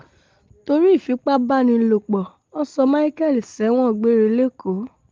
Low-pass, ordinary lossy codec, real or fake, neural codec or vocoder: 7.2 kHz; Opus, 32 kbps; real; none